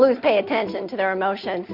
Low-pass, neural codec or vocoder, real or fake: 5.4 kHz; none; real